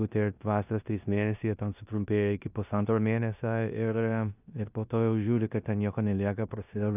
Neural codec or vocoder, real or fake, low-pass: codec, 16 kHz in and 24 kHz out, 0.9 kbps, LongCat-Audio-Codec, four codebook decoder; fake; 3.6 kHz